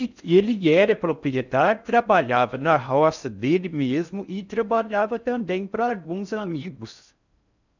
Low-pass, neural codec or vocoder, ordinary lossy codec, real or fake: 7.2 kHz; codec, 16 kHz in and 24 kHz out, 0.6 kbps, FocalCodec, streaming, 4096 codes; none; fake